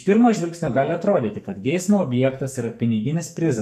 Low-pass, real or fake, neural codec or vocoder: 14.4 kHz; fake; codec, 44.1 kHz, 2.6 kbps, SNAC